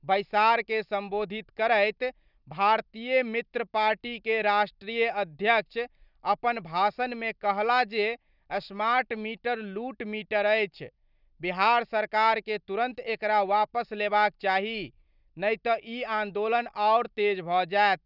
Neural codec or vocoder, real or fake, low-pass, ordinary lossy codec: none; real; 5.4 kHz; none